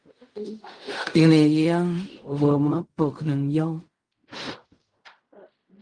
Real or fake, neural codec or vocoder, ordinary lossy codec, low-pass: fake; codec, 16 kHz in and 24 kHz out, 0.4 kbps, LongCat-Audio-Codec, fine tuned four codebook decoder; Opus, 32 kbps; 9.9 kHz